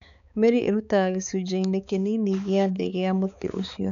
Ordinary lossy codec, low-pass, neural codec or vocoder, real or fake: none; 7.2 kHz; codec, 16 kHz, 4 kbps, X-Codec, HuBERT features, trained on balanced general audio; fake